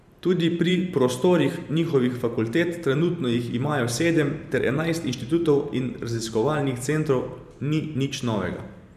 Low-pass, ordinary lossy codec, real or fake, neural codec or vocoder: 14.4 kHz; none; fake; vocoder, 44.1 kHz, 128 mel bands every 512 samples, BigVGAN v2